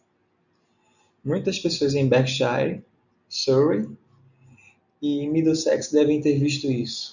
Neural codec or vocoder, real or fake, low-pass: none; real; 7.2 kHz